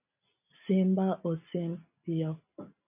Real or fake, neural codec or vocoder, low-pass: real; none; 3.6 kHz